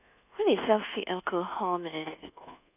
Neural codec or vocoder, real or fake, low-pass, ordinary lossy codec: codec, 24 kHz, 1.2 kbps, DualCodec; fake; 3.6 kHz; none